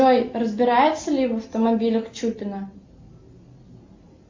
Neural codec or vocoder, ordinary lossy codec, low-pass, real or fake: none; MP3, 64 kbps; 7.2 kHz; real